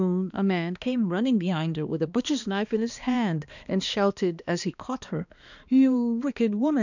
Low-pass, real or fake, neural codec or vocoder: 7.2 kHz; fake; codec, 16 kHz, 2 kbps, X-Codec, HuBERT features, trained on balanced general audio